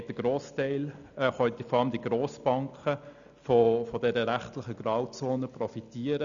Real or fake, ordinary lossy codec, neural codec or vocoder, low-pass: real; none; none; 7.2 kHz